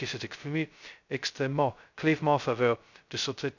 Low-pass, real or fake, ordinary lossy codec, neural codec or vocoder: 7.2 kHz; fake; none; codec, 16 kHz, 0.2 kbps, FocalCodec